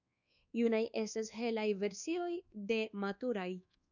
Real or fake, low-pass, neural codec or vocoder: fake; 7.2 kHz; codec, 24 kHz, 1.2 kbps, DualCodec